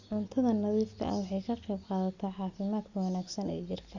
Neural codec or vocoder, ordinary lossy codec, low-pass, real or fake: none; Opus, 64 kbps; 7.2 kHz; real